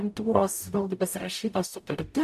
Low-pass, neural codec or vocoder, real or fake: 14.4 kHz; codec, 44.1 kHz, 0.9 kbps, DAC; fake